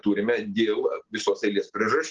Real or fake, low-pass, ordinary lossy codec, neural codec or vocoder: real; 7.2 kHz; Opus, 16 kbps; none